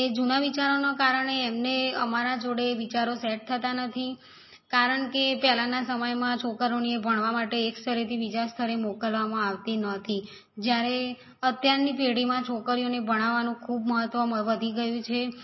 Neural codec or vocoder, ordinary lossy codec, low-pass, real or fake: none; MP3, 24 kbps; 7.2 kHz; real